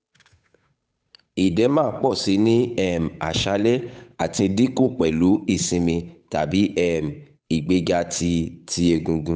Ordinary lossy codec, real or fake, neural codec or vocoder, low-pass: none; fake; codec, 16 kHz, 8 kbps, FunCodec, trained on Chinese and English, 25 frames a second; none